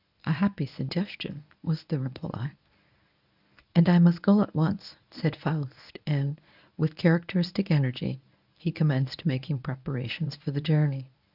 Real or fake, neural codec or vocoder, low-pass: fake; codec, 24 kHz, 0.9 kbps, WavTokenizer, medium speech release version 1; 5.4 kHz